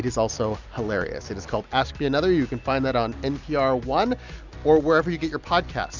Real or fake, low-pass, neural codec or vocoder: real; 7.2 kHz; none